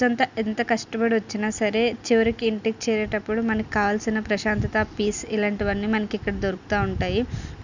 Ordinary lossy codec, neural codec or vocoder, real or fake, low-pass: none; none; real; 7.2 kHz